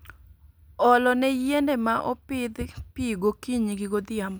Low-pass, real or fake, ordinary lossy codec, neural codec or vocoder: none; real; none; none